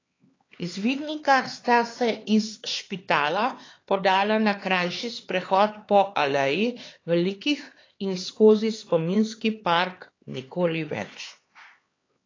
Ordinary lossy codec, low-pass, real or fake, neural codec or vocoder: AAC, 32 kbps; 7.2 kHz; fake; codec, 16 kHz, 4 kbps, X-Codec, HuBERT features, trained on LibriSpeech